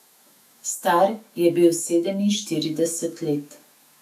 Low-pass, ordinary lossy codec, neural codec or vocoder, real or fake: 14.4 kHz; none; autoencoder, 48 kHz, 128 numbers a frame, DAC-VAE, trained on Japanese speech; fake